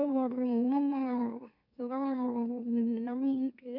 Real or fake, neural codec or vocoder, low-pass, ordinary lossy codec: fake; autoencoder, 44.1 kHz, a latent of 192 numbers a frame, MeloTTS; 5.4 kHz; none